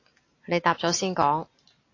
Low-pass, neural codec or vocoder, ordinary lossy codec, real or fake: 7.2 kHz; none; AAC, 32 kbps; real